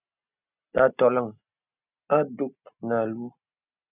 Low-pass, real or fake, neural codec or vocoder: 3.6 kHz; real; none